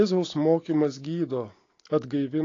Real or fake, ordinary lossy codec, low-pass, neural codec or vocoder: real; AAC, 32 kbps; 7.2 kHz; none